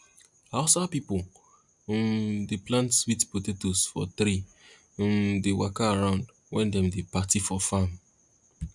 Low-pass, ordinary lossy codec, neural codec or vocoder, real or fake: 10.8 kHz; MP3, 96 kbps; none; real